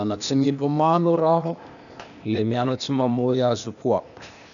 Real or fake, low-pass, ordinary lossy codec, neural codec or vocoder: fake; 7.2 kHz; none; codec, 16 kHz, 0.8 kbps, ZipCodec